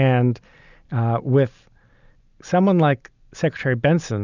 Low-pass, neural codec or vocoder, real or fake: 7.2 kHz; none; real